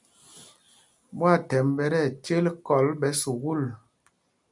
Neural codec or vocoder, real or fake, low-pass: none; real; 10.8 kHz